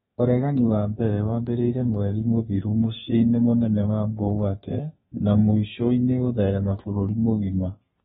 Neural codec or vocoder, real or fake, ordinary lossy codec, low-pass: codec, 32 kHz, 1.9 kbps, SNAC; fake; AAC, 16 kbps; 14.4 kHz